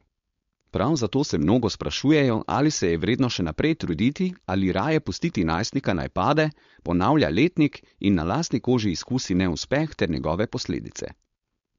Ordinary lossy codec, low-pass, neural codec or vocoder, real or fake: MP3, 48 kbps; 7.2 kHz; codec, 16 kHz, 4.8 kbps, FACodec; fake